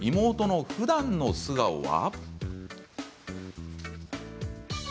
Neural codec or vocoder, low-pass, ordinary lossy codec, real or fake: none; none; none; real